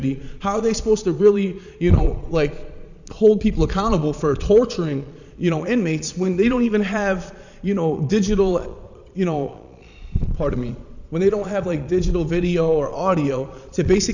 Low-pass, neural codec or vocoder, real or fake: 7.2 kHz; vocoder, 22.05 kHz, 80 mel bands, Vocos; fake